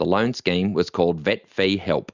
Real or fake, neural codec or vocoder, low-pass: real; none; 7.2 kHz